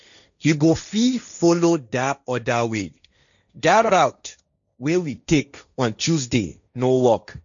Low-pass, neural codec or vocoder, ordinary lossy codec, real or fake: 7.2 kHz; codec, 16 kHz, 1.1 kbps, Voila-Tokenizer; none; fake